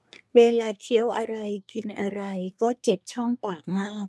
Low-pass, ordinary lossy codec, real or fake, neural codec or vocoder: none; none; fake; codec, 24 kHz, 1 kbps, SNAC